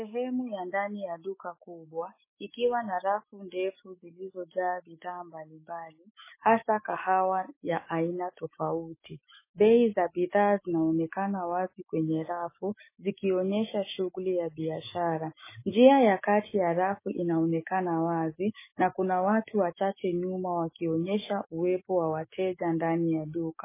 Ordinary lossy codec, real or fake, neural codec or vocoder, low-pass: MP3, 16 kbps; fake; autoencoder, 48 kHz, 128 numbers a frame, DAC-VAE, trained on Japanese speech; 3.6 kHz